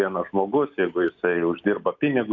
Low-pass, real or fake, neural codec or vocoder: 7.2 kHz; real; none